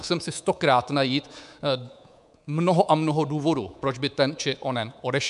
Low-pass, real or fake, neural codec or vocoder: 10.8 kHz; fake; codec, 24 kHz, 3.1 kbps, DualCodec